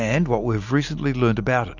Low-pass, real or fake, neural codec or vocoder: 7.2 kHz; real; none